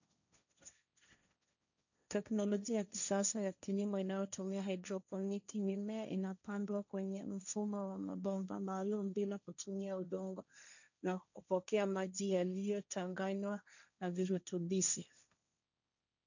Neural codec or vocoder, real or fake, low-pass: codec, 16 kHz, 1.1 kbps, Voila-Tokenizer; fake; 7.2 kHz